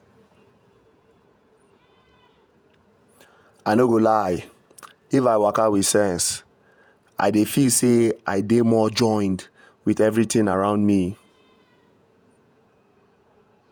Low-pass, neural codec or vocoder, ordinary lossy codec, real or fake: none; vocoder, 48 kHz, 128 mel bands, Vocos; none; fake